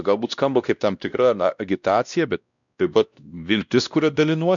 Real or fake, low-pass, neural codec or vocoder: fake; 7.2 kHz; codec, 16 kHz, 1 kbps, X-Codec, WavLM features, trained on Multilingual LibriSpeech